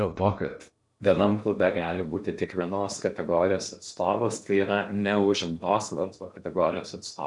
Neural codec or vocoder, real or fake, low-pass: codec, 16 kHz in and 24 kHz out, 0.8 kbps, FocalCodec, streaming, 65536 codes; fake; 10.8 kHz